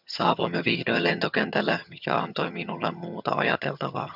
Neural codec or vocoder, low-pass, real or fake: vocoder, 22.05 kHz, 80 mel bands, HiFi-GAN; 5.4 kHz; fake